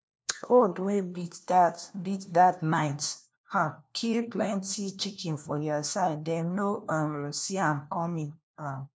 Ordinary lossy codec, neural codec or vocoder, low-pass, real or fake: none; codec, 16 kHz, 1 kbps, FunCodec, trained on LibriTTS, 50 frames a second; none; fake